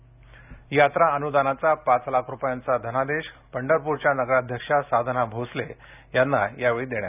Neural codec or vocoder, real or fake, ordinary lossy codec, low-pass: none; real; none; 3.6 kHz